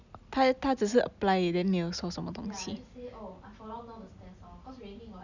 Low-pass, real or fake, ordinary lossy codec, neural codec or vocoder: 7.2 kHz; real; none; none